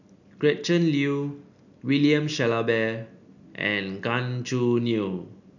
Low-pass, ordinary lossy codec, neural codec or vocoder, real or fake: 7.2 kHz; none; none; real